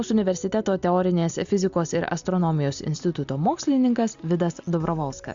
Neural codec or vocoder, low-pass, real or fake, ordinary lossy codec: none; 7.2 kHz; real; Opus, 64 kbps